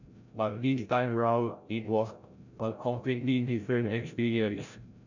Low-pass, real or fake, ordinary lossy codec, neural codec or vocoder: 7.2 kHz; fake; none; codec, 16 kHz, 0.5 kbps, FreqCodec, larger model